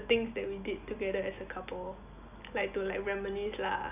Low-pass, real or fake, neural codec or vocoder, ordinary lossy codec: 3.6 kHz; real; none; none